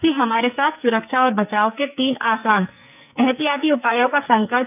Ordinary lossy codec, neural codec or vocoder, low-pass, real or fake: none; codec, 44.1 kHz, 2.6 kbps, SNAC; 3.6 kHz; fake